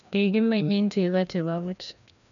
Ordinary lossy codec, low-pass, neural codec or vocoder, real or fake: none; 7.2 kHz; codec, 16 kHz, 1 kbps, FreqCodec, larger model; fake